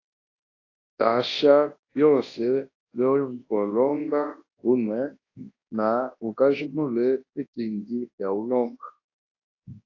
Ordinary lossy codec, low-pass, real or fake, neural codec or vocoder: AAC, 32 kbps; 7.2 kHz; fake; codec, 24 kHz, 0.9 kbps, WavTokenizer, large speech release